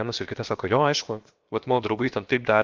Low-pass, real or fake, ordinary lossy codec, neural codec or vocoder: 7.2 kHz; fake; Opus, 32 kbps; codec, 16 kHz, 0.7 kbps, FocalCodec